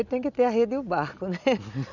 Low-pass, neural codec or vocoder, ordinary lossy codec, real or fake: 7.2 kHz; none; none; real